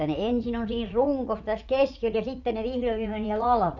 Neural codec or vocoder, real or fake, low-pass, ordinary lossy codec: vocoder, 44.1 kHz, 80 mel bands, Vocos; fake; 7.2 kHz; none